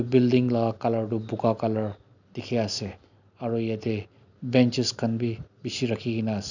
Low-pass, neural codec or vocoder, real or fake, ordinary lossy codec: 7.2 kHz; none; real; none